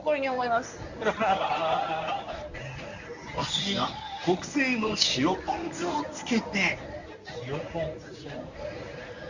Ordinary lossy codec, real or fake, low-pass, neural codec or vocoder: none; fake; 7.2 kHz; codec, 24 kHz, 0.9 kbps, WavTokenizer, medium speech release version 1